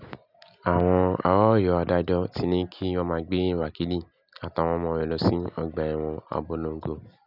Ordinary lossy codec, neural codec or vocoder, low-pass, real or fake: none; none; 5.4 kHz; real